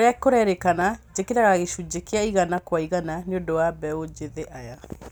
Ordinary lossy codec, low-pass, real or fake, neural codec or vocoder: none; none; real; none